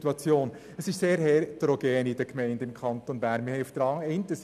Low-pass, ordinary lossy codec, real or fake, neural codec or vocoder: 14.4 kHz; none; real; none